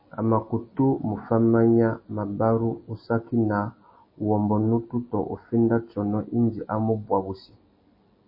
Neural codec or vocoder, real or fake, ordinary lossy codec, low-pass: none; real; MP3, 24 kbps; 5.4 kHz